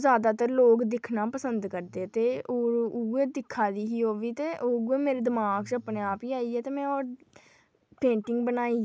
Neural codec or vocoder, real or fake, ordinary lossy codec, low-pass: none; real; none; none